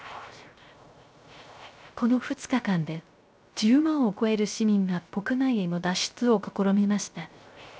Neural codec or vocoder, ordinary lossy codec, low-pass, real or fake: codec, 16 kHz, 0.3 kbps, FocalCodec; none; none; fake